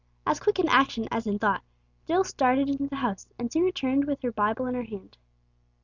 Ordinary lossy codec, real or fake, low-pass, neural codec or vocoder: Opus, 64 kbps; real; 7.2 kHz; none